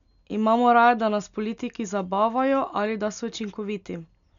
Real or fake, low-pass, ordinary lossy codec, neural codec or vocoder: real; 7.2 kHz; none; none